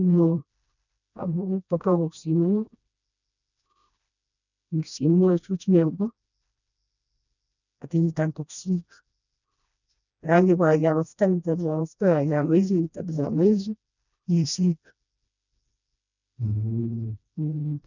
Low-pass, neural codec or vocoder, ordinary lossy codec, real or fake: 7.2 kHz; codec, 16 kHz, 1 kbps, FreqCodec, smaller model; none; fake